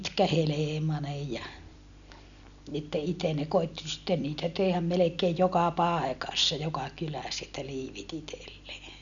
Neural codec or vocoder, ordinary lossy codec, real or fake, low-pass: none; none; real; 7.2 kHz